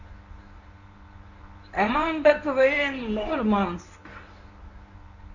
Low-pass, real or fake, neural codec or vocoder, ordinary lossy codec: 7.2 kHz; fake; codec, 24 kHz, 0.9 kbps, WavTokenizer, medium speech release version 1; none